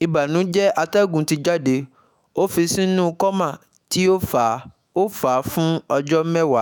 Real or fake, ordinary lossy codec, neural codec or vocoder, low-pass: fake; none; autoencoder, 48 kHz, 128 numbers a frame, DAC-VAE, trained on Japanese speech; none